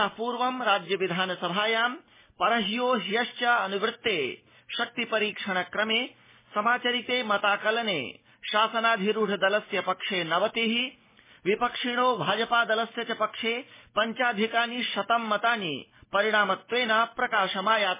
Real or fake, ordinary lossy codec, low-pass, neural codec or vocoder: real; MP3, 16 kbps; 3.6 kHz; none